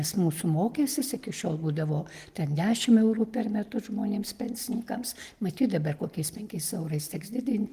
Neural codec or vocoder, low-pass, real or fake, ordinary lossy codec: none; 14.4 kHz; real; Opus, 24 kbps